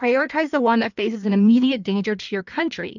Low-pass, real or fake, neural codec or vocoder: 7.2 kHz; fake; codec, 16 kHz in and 24 kHz out, 1.1 kbps, FireRedTTS-2 codec